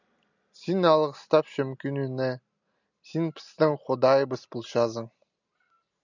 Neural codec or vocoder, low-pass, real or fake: none; 7.2 kHz; real